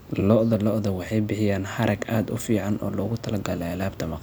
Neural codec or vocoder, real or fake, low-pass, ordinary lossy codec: none; real; none; none